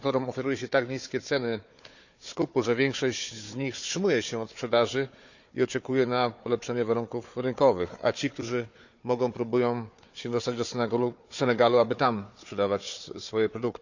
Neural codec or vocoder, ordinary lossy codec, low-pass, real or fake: codec, 16 kHz, 4 kbps, FunCodec, trained on Chinese and English, 50 frames a second; none; 7.2 kHz; fake